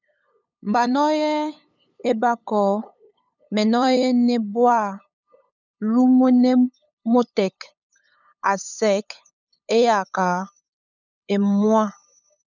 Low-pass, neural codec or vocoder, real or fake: 7.2 kHz; codec, 16 kHz, 8 kbps, FunCodec, trained on LibriTTS, 25 frames a second; fake